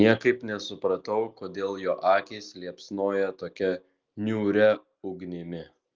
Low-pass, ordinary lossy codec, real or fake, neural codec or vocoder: 7.2 kHz; Opus, 32 kbps; real; none